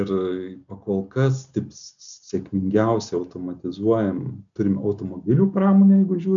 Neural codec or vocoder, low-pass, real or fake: none; 7.2 kHz; real